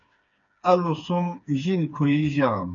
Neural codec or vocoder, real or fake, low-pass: codec, 16 kHz, 4 kbps, FreqCodec, smaller model; fake; 7.2 kHz